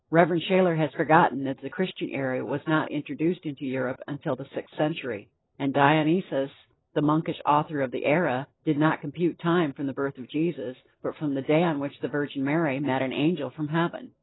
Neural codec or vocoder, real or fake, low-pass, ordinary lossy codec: none; real; 7.2 kHz; AAC, 16 kbps